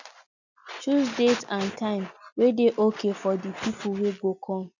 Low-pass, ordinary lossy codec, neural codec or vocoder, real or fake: 7.2 kHz; none; none; real